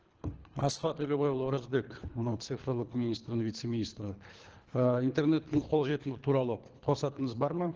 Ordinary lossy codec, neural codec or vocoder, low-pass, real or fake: Opus, 16 kbps; codec, 24 kHz, 3 kbps, HILCodec; 7.2 kHz; fake